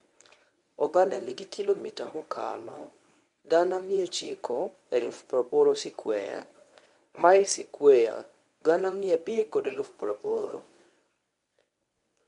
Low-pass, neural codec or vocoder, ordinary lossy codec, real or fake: 10.8 kHz; codec, 24 kHz, 0.9 kbps, WavTokenizer, medium speech release version 1; MP3, 64 kbps; fake